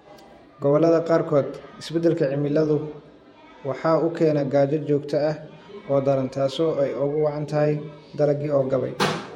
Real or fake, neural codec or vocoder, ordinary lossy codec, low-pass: fake; vocoder, 48 kHz, 128 mel bands, Vocos; MP3, 64 kbps; 19.8 kHz